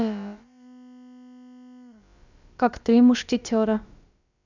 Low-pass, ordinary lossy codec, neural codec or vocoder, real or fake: 7.2 kHz; none; codec, 16 kHz, about 1 kbps, DyCAST, with the encoder's durations; fake